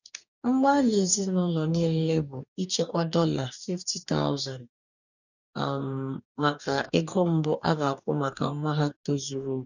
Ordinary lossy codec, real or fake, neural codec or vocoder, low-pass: none; fake; codec, 44.1 kHz, 2.6 kbps, DAC; 7.2 kHz